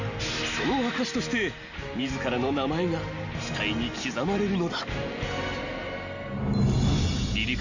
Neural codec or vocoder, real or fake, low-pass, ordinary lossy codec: none; real; 7.2 kHz; none